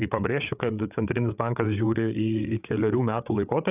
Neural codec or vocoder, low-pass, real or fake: codec, 16 kHz, 8 kbps, FreqCodec, larger model; 3.6 kHz; fake